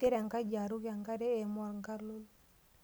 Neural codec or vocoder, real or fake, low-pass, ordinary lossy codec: none; real; none; none